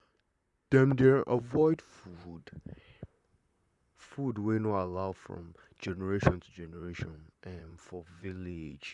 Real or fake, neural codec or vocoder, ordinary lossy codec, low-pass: fake; vocoder, 44.1 kHz, 128 mel bands, Pupu-Vocoder; none; 10.8 kHz